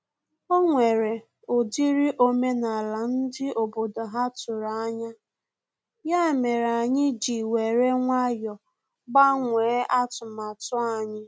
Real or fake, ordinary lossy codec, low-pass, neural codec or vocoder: real; none; none; none